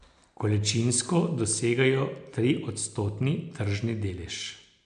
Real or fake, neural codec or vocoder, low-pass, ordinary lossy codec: real; none; 9.9 kHz; MP3, 64 kbps